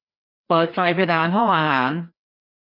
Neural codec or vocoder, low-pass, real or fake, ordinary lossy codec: codec, 16 kHz, 2 kbps, FreqCodec, larger model; 5.4 kHz; fake; MP3, 48 kbps